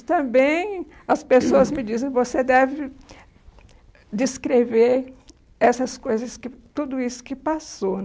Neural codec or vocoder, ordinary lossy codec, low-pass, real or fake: none; none; none; real